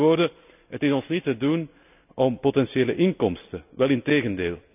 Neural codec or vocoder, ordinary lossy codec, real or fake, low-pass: none; none; real; 3.6 kHz